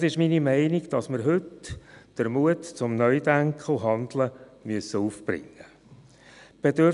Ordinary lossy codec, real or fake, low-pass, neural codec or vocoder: none; real; 10.8 kHz; none